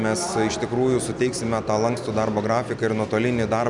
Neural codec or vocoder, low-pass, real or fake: none; 10.8 kHz; real